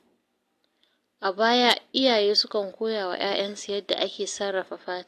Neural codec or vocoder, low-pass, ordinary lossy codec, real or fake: none; 14.4 kHz; AAC, 64 kbps; real